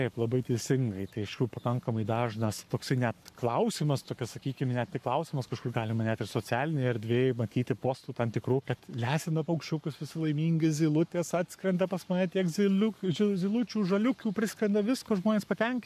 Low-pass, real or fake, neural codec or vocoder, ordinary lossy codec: 14.4 kHz; fake; codec, 44.1 kHz, 7.8 kbps, Pupu-Codec; AAC, 96 kbps